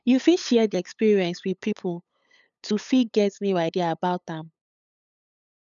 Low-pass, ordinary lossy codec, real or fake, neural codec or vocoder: 7.2 kHz; none; fake; codec, 16 kHz, 8 kbps, FunCodec, trained on LibriTTS, 25 frames a second